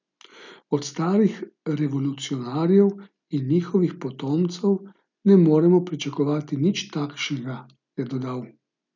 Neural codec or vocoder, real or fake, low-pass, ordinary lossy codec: none; real; 7.2 kHz; none